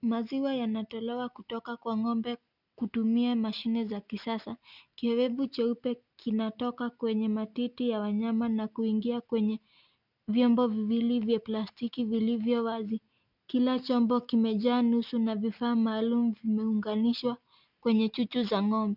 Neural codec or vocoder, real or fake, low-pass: none; real; 5.4 kHz